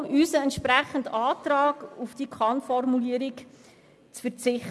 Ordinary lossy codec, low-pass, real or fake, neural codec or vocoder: none; none; real; none